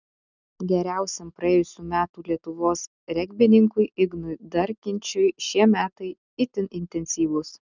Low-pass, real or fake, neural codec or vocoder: 7.2 kHz; real; none